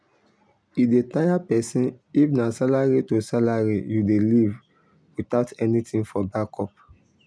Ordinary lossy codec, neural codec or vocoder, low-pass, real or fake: none; none; none; real